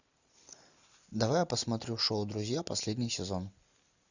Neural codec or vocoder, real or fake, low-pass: none; real; 7.2 kHz